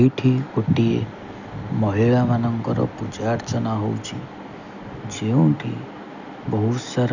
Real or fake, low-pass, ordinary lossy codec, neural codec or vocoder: real; 7.2 kHz; none; none